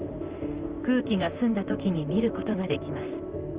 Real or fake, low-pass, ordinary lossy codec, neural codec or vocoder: fake; 3.6 kHz; Opus, 24 kbps; vocoder, 44.1 kHz, 128 mel bands, Pupu-Vocoder